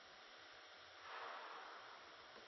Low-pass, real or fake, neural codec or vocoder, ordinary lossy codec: 7.2 kHz; real; none; MP3, 24 kbps